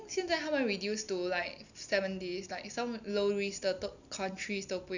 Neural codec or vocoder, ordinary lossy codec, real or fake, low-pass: none; none; real; 7.2 kHz